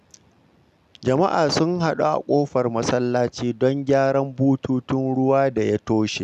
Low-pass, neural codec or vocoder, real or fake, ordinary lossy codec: 14.4 kHz; none; real; none